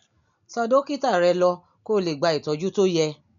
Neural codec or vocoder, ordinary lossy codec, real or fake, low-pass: none; none; real; 7.2 kHz